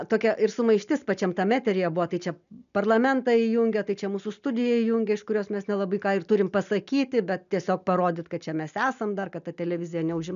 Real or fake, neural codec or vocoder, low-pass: real; none; 7.2 kHz